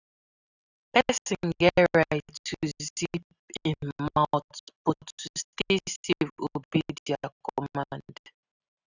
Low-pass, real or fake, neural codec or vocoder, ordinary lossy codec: 7.2 kHz; real; none; none